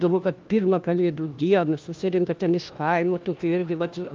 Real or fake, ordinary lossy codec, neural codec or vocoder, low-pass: fake; Opus, 24 kbps; codec, 16 kHz, 1 kbps, FunCodec, trained on LibriTTS, 50 frames a second; 7.2 kHz